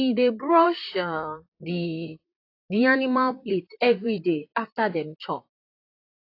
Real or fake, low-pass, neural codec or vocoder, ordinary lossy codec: fake; 5.4 kHz; vocoder, 44.1 kHz, 128 mel bands, Pupu-Vocoder; AAC, 32 kbps